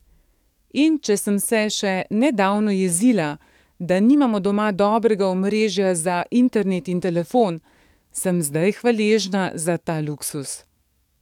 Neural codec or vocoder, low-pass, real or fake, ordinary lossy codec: codec, 44.1 kHz, 7.8 kbps, DAC; 19.8 kHz; fake; none